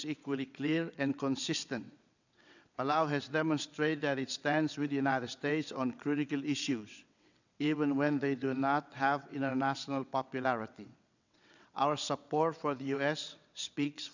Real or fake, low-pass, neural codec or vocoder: fake; 7.2 kHz; vocoder, 22.05 kHz, 80 mel bands, WaveNeXt